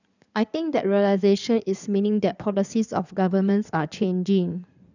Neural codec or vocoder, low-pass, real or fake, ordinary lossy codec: codec, 16 kHz in and 24 kHz out, 2.2 kbps, FireRedTTS-2 codec; 7.2 kHz; fake; none